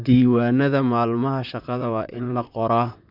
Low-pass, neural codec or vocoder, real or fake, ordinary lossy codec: 5.4 kHz; vocoder, 44.1 kHz, 128 mel bands, Pupu-Vocoder; fake; none